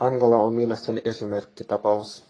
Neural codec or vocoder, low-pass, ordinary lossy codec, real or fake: codec, 44.1 kHz, 3.4 kbps, Pupu-Codec; 9.9 kHz; AAC, 32 kbps; fake